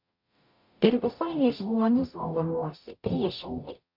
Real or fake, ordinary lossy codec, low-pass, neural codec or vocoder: fake; MP3, 48 kbps; 5.4 kHz; codec, 44.1 kHz, 0.9 kbps, DAC